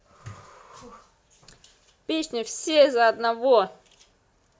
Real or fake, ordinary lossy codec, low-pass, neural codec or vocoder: real; none; none; none